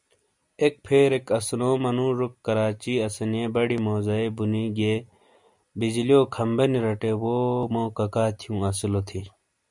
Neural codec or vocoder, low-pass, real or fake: none; 10.8 kHz; real